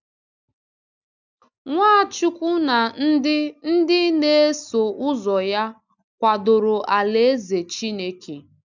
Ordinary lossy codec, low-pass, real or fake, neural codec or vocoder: none; 7.2 kHz; real; none